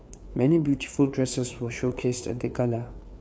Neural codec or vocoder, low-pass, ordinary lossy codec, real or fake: codec, 16 kHz, 4 kbps, FreqCodec, larger model; none; none; fake